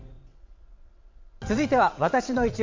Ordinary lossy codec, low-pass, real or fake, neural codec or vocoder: MP3, 64 kbps; 7.2 kHz; fake; vocoder, 22.05 kHz, 80 mel bands, WaveNeXt